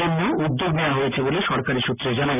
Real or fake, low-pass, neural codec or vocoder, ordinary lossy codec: real; 3.6 kHz; none; none